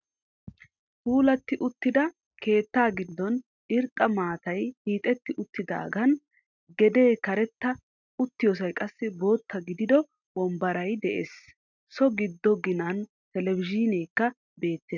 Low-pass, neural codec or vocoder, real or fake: 7.2 kHz; none; real